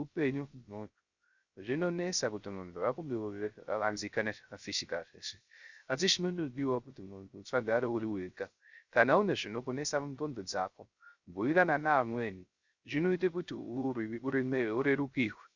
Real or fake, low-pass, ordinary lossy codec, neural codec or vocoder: fake; 7.2 kHz; Opus, 64 kbps; codec, 16 kHz, 0.3 kbps, FocalCodec